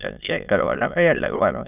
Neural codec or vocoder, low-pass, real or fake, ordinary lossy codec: autoencoder, 22.05 kHz, a latent of 192 numbers a frame, VITS, trained on many speakers; 3.6 kHz; fake; none